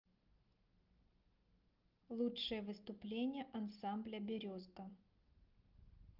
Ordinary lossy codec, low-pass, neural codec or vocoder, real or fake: Opus, 32 kbps; 5.4 kHz; none; real